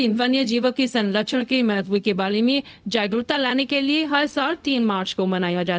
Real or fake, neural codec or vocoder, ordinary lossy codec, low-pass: fake; codec, 16 kHz, 0.4 kbps, LongCat-Audio-Codec; none; none